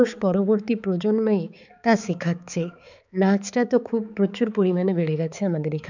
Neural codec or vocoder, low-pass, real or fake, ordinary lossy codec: codec, 16 kHz, 4 kbps, X-Codec, HuBERT features, trained on balanced general audio; 7.2 kHz; fake; none